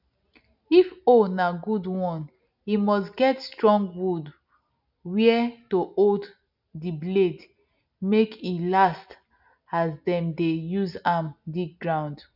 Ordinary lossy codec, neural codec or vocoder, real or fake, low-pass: none; none; real; 5.4 kHz